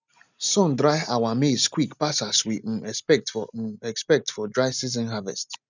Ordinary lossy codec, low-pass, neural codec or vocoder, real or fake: none; 7.2 kHz; none; real